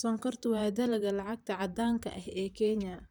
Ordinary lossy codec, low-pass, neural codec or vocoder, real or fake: none; none; vocoder, 44.1 kHz, 128 mel bands, Pupu-Vocoder; fake